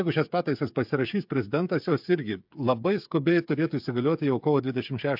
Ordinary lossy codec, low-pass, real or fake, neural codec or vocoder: MP3, 48 kbps; 5.4 kHz; fake; codec, 16 kHz, 8 kbps, FreqCodec, smaller model